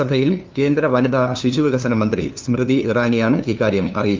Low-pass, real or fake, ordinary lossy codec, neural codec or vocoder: 7.2 kHz; fake; Opus, 32 kbps; codec, 16 kHz, 2 kbps, FunCodec, trained on LibriTTS, 25 frames a second